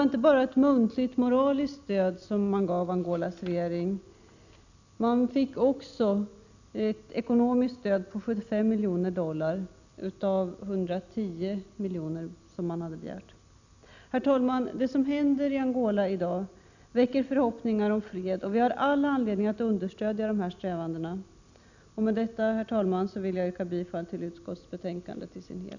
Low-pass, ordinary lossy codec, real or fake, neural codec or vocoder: 7.2 kHz; none; real; none